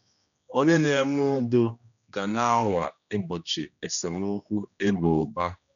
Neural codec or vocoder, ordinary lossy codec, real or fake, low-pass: codec, 16 kHz, 1 kbps, X-Codec, HuBERT features, trained on general audio; none; fake; 7.2 kHz